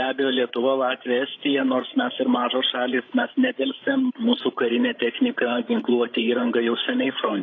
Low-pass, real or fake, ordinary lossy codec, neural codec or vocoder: 7.2 kHz; fake; AAC, 32 kbps; codec, 16 kHz, 16 kbps, FreqCodec, larger model